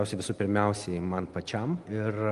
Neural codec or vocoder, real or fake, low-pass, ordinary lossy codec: none; real; 10.8 kHz; Opus, 24 kbps